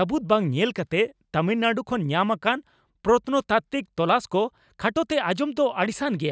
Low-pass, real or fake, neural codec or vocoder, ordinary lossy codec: none; real; none; none